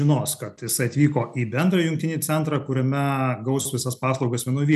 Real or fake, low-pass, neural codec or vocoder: real; 14.4 kHz; none